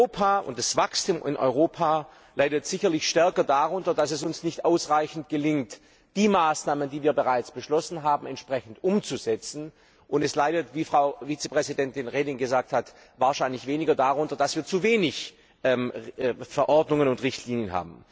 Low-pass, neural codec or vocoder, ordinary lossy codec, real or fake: none; none; none; real